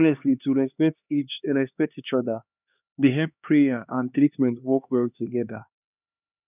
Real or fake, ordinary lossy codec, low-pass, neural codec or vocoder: fake; none; 3.6 kHz; codec, 16 kHz, 2 kbps, X-Codec, HuBERT features, trained on LibriSpeech